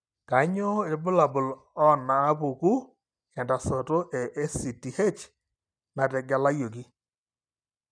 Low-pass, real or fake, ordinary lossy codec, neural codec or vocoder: 9.9 kHz; real; none; none